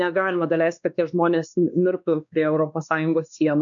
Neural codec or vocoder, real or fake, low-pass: codec, 16 kHz, 2 kbps, X-Codec, WavLM features, trained on Multilingual LibriSpeech; fake; 7.2 kHz